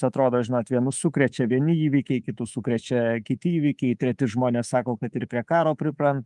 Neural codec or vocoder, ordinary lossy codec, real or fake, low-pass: codec, 24 kHz, 3.1 kbps, DualCodec; Opus, 32 kbps; fake; 10.8 kHz